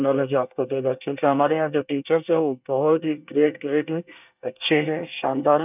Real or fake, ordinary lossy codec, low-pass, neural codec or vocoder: fake; none; 3.6 kHz; codec, 24 kHz, 1 kbps, SNAC